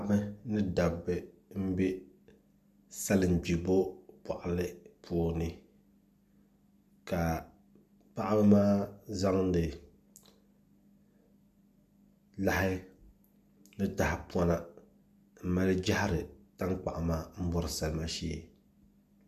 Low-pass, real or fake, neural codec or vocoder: 14.4 kHz; real; none